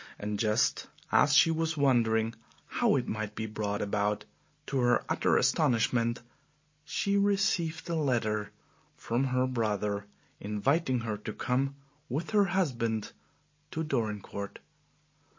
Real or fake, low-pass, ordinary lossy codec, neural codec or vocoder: real; 7.2 kHz; MP3, 32 kbps; none